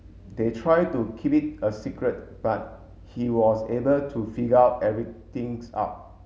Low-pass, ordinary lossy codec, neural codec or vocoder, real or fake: none; none; none; real